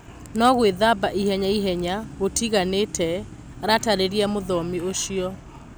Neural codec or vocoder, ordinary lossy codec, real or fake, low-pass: none; none; real; none